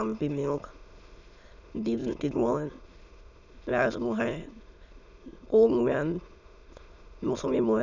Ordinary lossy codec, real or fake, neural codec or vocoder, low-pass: none; fake; autoencoder, 22.05 kHz, a latent of 192 numbers a frame, VITS, trained on many speakers; 7.2 kHz